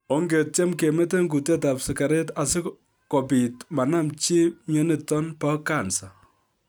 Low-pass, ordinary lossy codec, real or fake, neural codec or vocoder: none; none; real; none